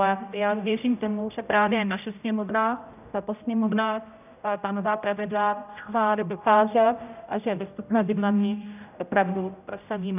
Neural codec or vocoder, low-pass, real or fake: codec, 16 kHz, 0.5 kbps, X-Codec, HuBERT features, trained on general audio; 3.6 kHz; fake